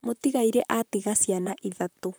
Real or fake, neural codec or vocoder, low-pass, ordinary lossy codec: fake; vocoder, 44.1 kHz, 128 mel bands, Pupu-Vocoder; none; none